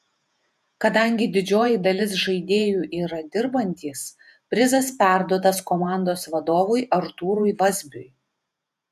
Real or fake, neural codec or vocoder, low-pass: fake; vocoder, 48 kHz, 128 mel bands, Vocos; 14.4 kHz